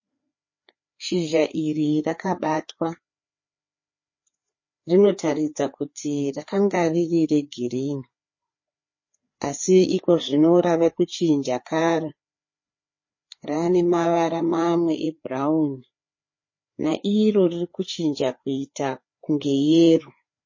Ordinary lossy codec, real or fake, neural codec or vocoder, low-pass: MP3, 32 kbps; fake; codec, 16 kHz, 4 kbps, FreqCodec, larger model; 7.2 kHz